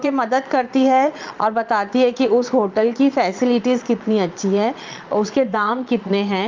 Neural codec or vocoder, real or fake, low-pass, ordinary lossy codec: vocoder, 22.05 kHz, 80 mel bands, Vocos; fake; 7.2 kHz; Opus, 24 kbps